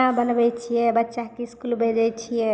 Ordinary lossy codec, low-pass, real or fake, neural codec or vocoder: none; none; real; none